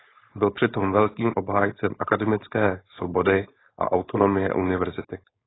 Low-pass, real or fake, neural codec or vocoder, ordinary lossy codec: 7.2 kHz; fake; codec, 16 kHz, 4.8 kbps, FACodec; AAC, 16 kbps